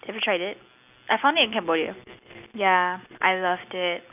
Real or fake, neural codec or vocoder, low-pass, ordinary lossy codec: real; none; 3.6 kHz; none